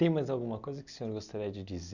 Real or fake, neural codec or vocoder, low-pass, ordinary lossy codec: real; none; 7.2 kHz; none